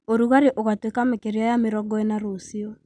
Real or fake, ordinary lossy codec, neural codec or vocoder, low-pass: real; none; none; 9.9 kHz